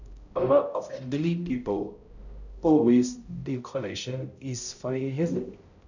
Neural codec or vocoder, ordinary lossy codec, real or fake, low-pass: codec, 16 kHz, 0.5 kbps, X-Codec, HuBERT features, trained on balanced general audio; none; fake; 7.2 kHz